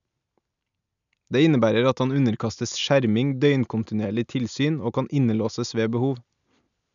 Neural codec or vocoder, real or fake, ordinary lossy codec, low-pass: none; real; none; 7.2 kHz